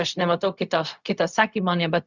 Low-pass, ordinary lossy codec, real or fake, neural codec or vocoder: 7.2 kHz; Opus, 64 kbps; fake; codec, 16 kHz, 0.4 kbps, LongCat-Audio-Codec